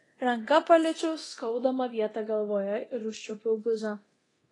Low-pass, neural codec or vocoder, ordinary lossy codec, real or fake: 10.8 kHz; codec, 24 kHz, 0.9 kbps, DualCodec; AAC, 32 kbps; fake